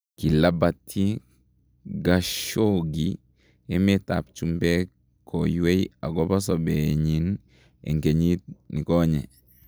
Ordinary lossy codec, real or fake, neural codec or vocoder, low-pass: none; real; none; none